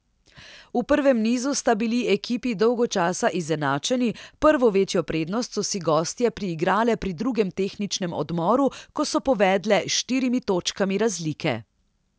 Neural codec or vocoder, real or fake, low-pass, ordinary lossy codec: none; real; none; none